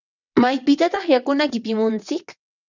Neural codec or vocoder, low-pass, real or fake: vocoder, 22.05 kHz, 80 mel bands, WaveNeXt; 7.2 kHz; fake